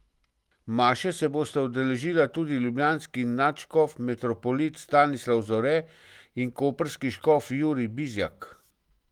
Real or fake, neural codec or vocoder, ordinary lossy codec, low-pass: fake; autoencoder, 48 kHz, 128 numbers a frame, DAC-VAE, trained on Japanese speech; Opus, 24 kbps; 19.8 kHz